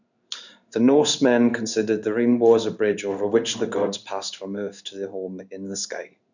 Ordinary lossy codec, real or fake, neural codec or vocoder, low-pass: none; fake; codec, 16 kHz in and 24 kHz out, 1 kbps, XY-Tokenizer; 7.2 kHz